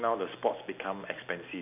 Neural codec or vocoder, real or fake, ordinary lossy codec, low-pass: none; real; none; 3.6 kHz